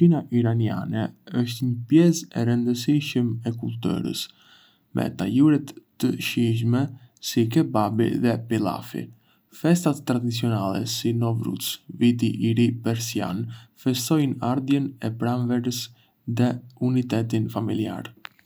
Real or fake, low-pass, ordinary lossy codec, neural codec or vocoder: real; none; none; none